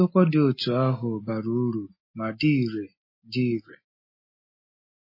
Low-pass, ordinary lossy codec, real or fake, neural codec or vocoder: 5.4 kHz; MP3, 24 kbps; real; none